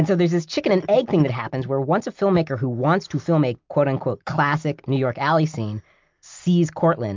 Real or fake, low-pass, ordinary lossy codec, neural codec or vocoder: real; 7.2 kHz; MP3, 64 kbps; none